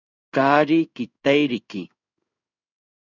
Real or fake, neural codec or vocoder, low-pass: fake; codec, 16 kHz in and 24 kHz out, 1 kbps, XY-Tokenizer; 7.2 kHz